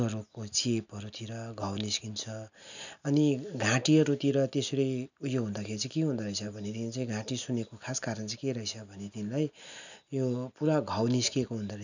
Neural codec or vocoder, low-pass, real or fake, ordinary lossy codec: none; 7.2 kHz; real; none